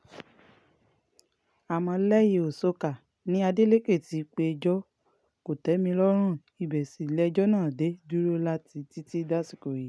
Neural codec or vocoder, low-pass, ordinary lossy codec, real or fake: none; none; none; real